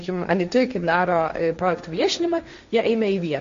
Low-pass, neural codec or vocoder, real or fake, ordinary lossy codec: 7.2 kHz; codec, 16 kHz, 1.1 kbps, Voila-Tokenizer; fake; MP3, 48 kbps